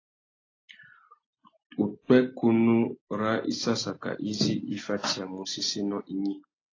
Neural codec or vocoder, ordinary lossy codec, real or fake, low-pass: none; AAC, 32 kbps; real; 7.2 kHz